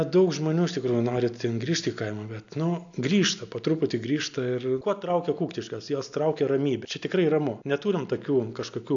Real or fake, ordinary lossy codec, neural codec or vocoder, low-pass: real; AAC, 64 kbps; none; 7.2 kHz